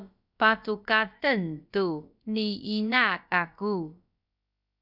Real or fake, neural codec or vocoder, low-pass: fake; codec, 16 kHz, about 1 kbps, DyCAST, with the encoder's durations; 5.4 kHz